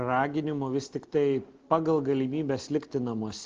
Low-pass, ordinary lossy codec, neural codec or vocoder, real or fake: 7.2 kHz; Opus, 16 kbps; none; real